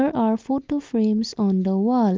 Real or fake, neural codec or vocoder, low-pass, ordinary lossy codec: real; none; 7.2 kHz; Opus, 32 kbps